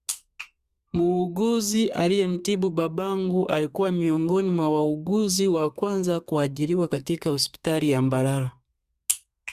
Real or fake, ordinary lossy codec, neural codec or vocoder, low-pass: fake; Opus, 64 kbps; codec, 32 kHz, 1.9 kbps, SNAC; 14.4 kHz